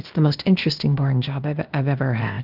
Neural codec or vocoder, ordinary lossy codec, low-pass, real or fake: codec, 16 kHz, 0.8 kbps, ZipCodec; Opus, 32 kbps; 5.4 kHz; fake